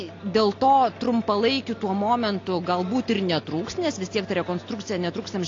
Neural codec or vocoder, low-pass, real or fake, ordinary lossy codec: none; 7.2 kHz; real; AAC, 32 kbps